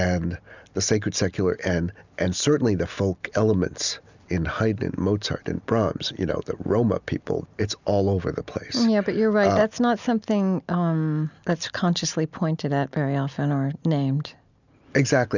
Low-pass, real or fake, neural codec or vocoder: 7.2 kHz; real; none